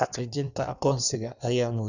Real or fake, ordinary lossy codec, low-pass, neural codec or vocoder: fake; none; 7.2 kHz; codec, 16 kHz in and 24 kHz out, 1.1 kbps, FireRedTTS-2 codec